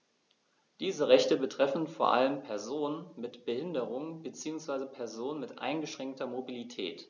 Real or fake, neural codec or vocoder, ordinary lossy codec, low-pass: real; none; none; 7.2 kHz